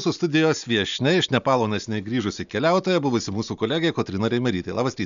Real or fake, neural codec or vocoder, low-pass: real; none; 7.2 kHz